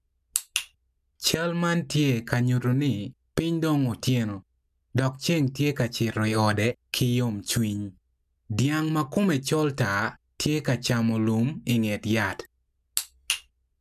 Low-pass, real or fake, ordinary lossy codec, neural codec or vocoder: 14.4 kHz; real; none; none